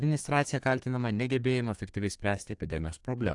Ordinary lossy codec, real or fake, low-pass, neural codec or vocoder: AAC, 64 kbps; fake; 10.8 kHz; codec, 32 kHz, 1.9 kbps, SNAC